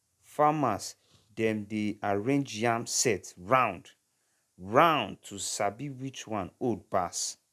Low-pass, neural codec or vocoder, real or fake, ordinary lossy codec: 14.4 kHz; none; real; none